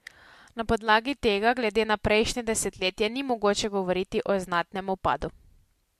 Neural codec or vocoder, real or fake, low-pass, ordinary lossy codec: none; real; 14.4 kHz; MP3, 64 kbps